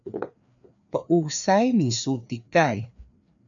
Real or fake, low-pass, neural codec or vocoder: fake; 7.2 kHz; codec, 16 kHz, 2 kbps, FreqCodec, larger model